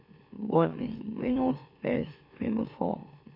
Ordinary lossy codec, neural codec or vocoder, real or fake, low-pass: MP3, 32 kbps; autoencoder, 44.1 kHz, a latent of 192 numbers a frame, MeloTTS; fake; 5.4 kHz